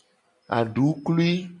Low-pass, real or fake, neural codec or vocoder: 10.8 kHz; real; none